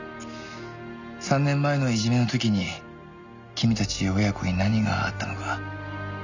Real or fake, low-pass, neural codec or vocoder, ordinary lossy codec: real; 7.2 kHz; none; none